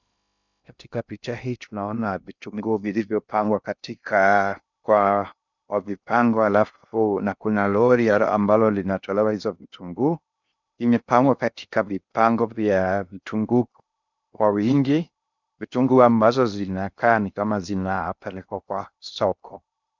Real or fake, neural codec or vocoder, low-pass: fake; codec, 16 kHz in and 24 kHz out, 0.6 kbps, FocalCodec, streaming, 2048 codes; 7.2 kHz